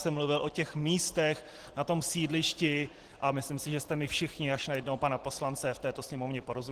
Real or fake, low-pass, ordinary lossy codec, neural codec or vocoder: real; 14.4 kHz; Opus, 16 kbps; none